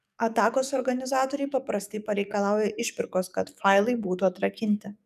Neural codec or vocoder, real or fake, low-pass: codec, 44.1 kHz, 7.8 kbps, DAC; fake; 14.4 kHz